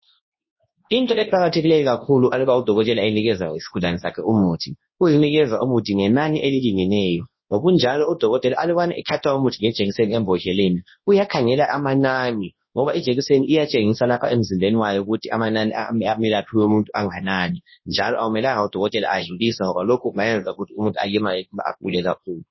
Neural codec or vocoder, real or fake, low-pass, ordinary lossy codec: codec, 24 kHz, 0.9 kbps, WavTokenizer, large speech release; fake; 7.2 kHz; MP3, 24 kbps